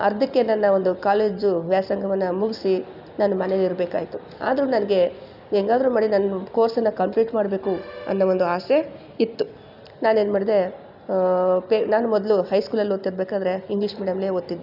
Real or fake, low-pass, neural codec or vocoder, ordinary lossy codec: real; 5.4 kHz; none; none